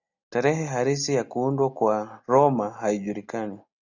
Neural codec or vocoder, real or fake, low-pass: none; real; 7.2 kHz